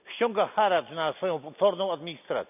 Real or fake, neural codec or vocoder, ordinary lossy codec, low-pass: real; none; none; 3.6 kHz